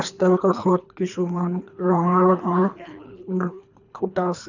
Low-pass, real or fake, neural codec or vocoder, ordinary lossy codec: 7.2 kHz; fake; codec, 24 kHz, 3 kbps, HILCodec; none